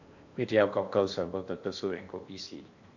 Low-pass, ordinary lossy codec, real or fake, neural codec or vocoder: 7.2 kHz; AAC, 48 kbps; fake; codec, 16 kHz in and 24 kHz out, 0.8 kbps, FocalCodec, streaming, 65536 codes